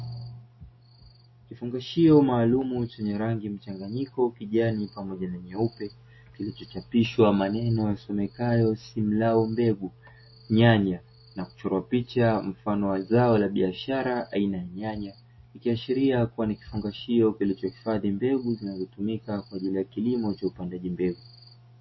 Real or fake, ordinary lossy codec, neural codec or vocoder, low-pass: real; MP3, 24 kbps; none; 7.2 kHz